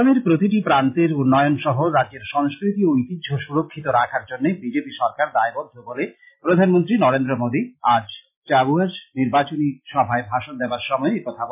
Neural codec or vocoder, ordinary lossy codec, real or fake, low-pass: none; MP3, 32 kbps; real; 3.6 kHz